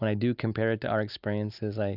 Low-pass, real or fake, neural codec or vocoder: 5.4 kHz; real; none